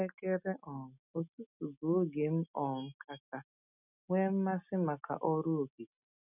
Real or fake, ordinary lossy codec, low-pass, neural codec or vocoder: real; none; 3.6 kHz; none